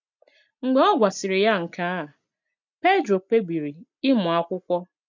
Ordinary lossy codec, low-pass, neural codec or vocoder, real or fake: MP3, 64 kbps; 7.2 kHz; none; real